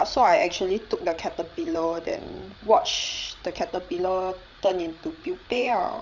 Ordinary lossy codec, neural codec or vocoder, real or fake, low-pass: none; codec, 16 kHz, 8 kbps, FreqCodec, larger model; fake; 7.2 kHz